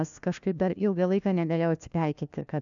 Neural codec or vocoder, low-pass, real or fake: codec, 16 kHz, 1 kbps, FunCodec, trained on LibriTTS, 50 frames a second; 7.2 kHz; fake